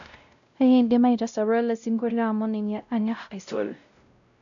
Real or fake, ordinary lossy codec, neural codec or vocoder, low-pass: fake; none; codec, 16 kHz, 0.5 kbps, X-Codec, WavLM features, trained on Multilingual LibriSpeech; 7.2 kHz